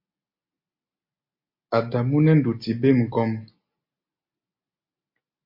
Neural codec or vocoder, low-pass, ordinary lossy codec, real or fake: none; 5.4 kHz; MP3, 32 kbps; real